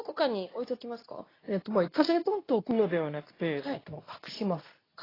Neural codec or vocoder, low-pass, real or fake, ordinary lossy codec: codec, 24 kHz, 0.9 kbps, WavTokenizer, medium speech release version 2; 5.4 kHz; fake; AAC, 24 kbps